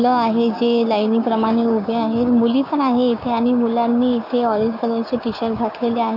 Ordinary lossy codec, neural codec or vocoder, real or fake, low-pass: none; codec, 16 kHz, 6 kbps, DAC; fake; 5.4 kHz